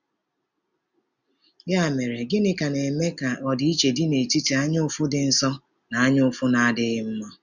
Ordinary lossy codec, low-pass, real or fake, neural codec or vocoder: none; 7.2 kHz; real; none